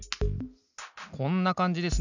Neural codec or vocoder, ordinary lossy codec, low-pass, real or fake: none; none; 7.2 kHz; real